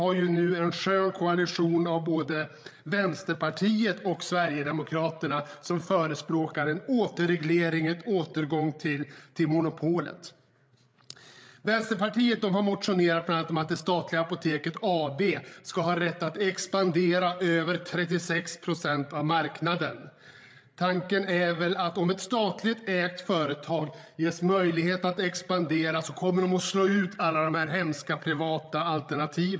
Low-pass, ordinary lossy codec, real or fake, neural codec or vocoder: none; none; fake; codec, 16 kHz, 8 kbps, FreqCodec, larger model